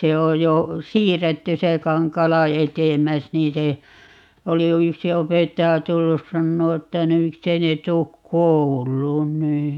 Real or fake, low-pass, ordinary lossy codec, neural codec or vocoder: fake; 19.8 kHz; none; autoencoder, 48 kHz, 128 numbers a frame, DAC-VAE, trained on Japanese speech